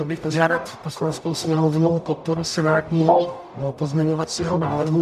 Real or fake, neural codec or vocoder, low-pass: fake; codec, 44.1 kHz, 0.9 kbps, DAC; 14.4 kHz